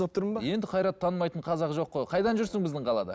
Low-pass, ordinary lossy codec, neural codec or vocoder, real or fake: none; none; none; real